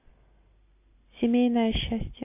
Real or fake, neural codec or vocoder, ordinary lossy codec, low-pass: real; none; MP3, 24 kbps; 3.6 kHz